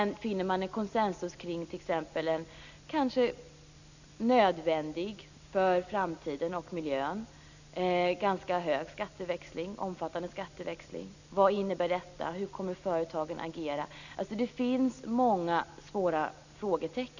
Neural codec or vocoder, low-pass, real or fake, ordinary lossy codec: none; 7.2 kHz; real; none